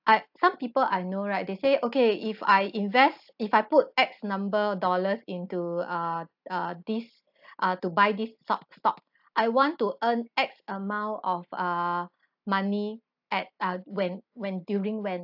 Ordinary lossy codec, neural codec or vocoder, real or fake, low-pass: AAC, 48 kbps; none; real; 5.4 kHz